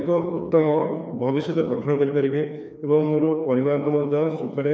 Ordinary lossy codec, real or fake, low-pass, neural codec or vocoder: none; fake; none; codec, 16 kHz, 2 kbps, FreqCodec, larger model